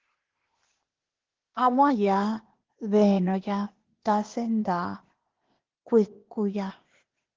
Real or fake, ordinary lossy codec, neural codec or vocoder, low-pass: fake; Opus, 16 kbps; codec, 16 kHz, 0.8 kbps, ZipCodec; 7.2 kHz